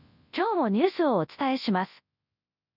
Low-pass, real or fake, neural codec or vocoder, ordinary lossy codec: 5.4 kHz; fake; codec, 24 kHz, 0.9 kbps, WavTokenizer, large speech release; none